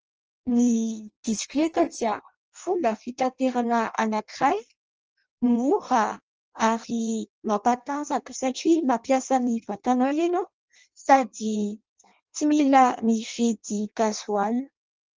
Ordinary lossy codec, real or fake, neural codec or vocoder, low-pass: Opus, 24 kbps; fake; codec, 16 kHz in and 24 kHz out, 0.6 kbps, FireRedTTS-2 codec; 7.2 kHz